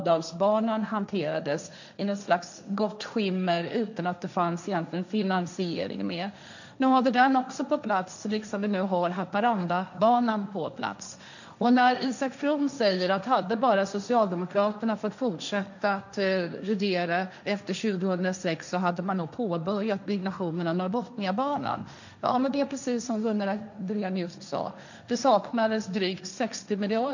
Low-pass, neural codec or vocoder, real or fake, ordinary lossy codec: 7.2 kHz; codec, 16 kHz, 1.1 kbps, Voila-Tokenizer; fake; none